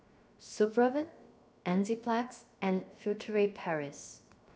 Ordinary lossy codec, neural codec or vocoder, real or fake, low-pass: none; codec, 16 kHz, 0.7 kbps, FocalCodec; fake; none